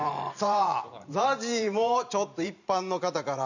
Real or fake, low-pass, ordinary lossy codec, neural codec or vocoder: fake; 7.2 kHz; none; vocoder, 44.1 kHz, 128 mel bands every 512 samples, BigVGAN v2